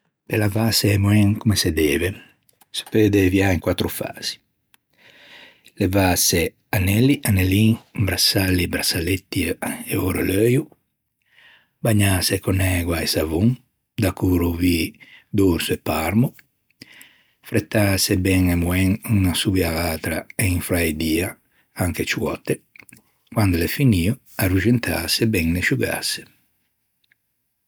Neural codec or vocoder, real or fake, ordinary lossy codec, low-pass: none; real; none; none